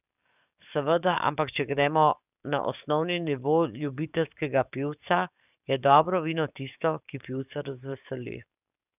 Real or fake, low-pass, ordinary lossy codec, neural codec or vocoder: fake; 3.6 kHz; none; codec, 44.1 kHz, 7.8 kbps, DAC